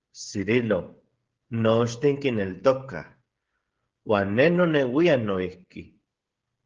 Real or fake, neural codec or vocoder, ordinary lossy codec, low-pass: fake; codec, 16 kHz, 16 kbps, FreqCodec, smaller model; Opus, 16 kbps; 7.2 kHz